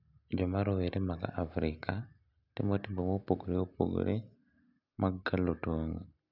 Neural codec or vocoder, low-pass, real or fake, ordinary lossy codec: none; 5.4 kHz; real; none